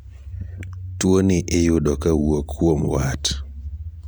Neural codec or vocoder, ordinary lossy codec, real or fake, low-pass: none; none; real; none